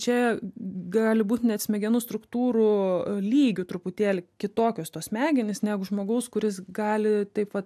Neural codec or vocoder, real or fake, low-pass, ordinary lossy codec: none; real; 14.4 kHz; AAC, 96 kbps